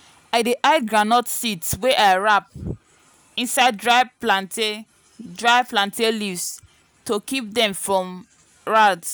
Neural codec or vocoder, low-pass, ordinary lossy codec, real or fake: none; none; none; real